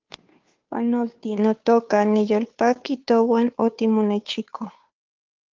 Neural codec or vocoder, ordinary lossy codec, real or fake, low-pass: codec, 16 kHz, 2 kbps, FunCodec, trained on Chinese and English, 25 frames a second; Opus, 24 kbps; fake; 7.2 kHz